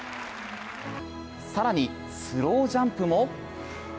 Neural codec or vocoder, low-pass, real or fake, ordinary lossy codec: none; none; real; none